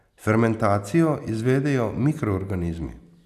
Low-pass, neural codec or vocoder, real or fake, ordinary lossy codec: 14.4 kHz; none; real; none